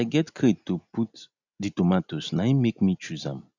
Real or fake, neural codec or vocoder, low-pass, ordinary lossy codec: real; none; 7.2 kHz; none